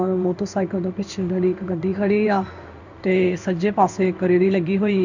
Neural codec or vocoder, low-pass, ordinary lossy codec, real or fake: codec, 16 kHz in and 24 kHz out, 1 kbps, XY-Tokenizer; 7.2 kHz; none; fake